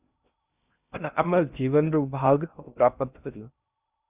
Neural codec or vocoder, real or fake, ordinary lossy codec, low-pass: codec, 16 kHz in and 24 kHz out, 0.6 kbps, FocalCodec, streaming, 2048 codes; fake; Opus, 64 kbps; 3.6 kHz